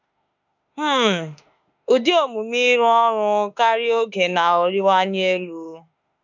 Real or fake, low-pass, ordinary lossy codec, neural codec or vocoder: fake; 7.2 kHz; none; autoencoder, 48 kHz, 32 numbers a frame, DAC-VAE, trained on Japanese speech